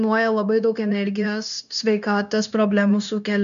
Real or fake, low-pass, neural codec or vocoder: fake; 7.2 kHz; codec, 16 kHz, 0.9 kbps, LongCat-Audio-Codec